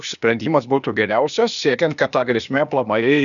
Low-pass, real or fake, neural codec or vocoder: 7.2 kHz; fake; codec, 16 kHz, 0.8 kbps, ZipCodec